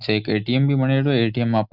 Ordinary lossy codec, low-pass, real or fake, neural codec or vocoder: Opus, 64 kbps; 5.4 kHz; real; none